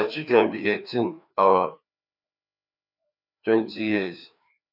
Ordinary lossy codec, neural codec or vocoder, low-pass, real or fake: none; codec, 16 kHz, 2 kbps, FreqCodec, larger model; 5.4 kHz; fake